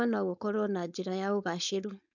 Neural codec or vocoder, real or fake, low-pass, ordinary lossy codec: codec, 16 kHz, 4.8 kbps, FACodec; fake; 7.2 kHz; none